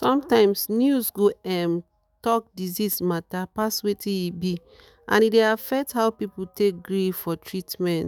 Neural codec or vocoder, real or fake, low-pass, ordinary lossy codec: autoencoder, 48 kHz, 128 numbers a frame, DAC-VAE, trained on Japanese speech; fake; none; none